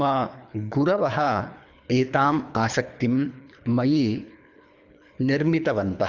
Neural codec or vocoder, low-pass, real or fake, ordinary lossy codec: codec, 24 kHz, 3 kbps, HILCodec; 7.2 kHz; fake; none